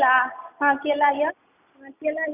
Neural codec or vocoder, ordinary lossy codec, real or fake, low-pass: none; none; real; 3.6 kHz